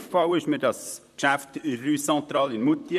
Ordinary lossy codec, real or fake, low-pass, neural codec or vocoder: none; fake; 14.4 kHz; vocoder, 44.1 kHz, 128 mel bands, Pupu-Vocoder